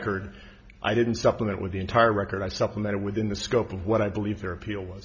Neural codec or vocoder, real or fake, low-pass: none; real; 7.2 kHz